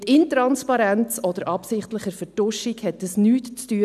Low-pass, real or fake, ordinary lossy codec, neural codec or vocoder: 14.4 kHz; real; none; none